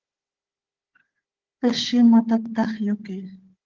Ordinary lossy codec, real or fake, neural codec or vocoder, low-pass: Opus, 16 kbps; fake; codec, 16 kHz, 4 kbps, FunCodec, trained on Chinese and English, 50 frames a second; 7.2 kHz